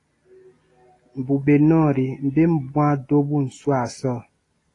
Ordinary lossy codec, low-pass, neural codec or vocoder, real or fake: AAC, 32 kbps; 10.8 kHz; none; real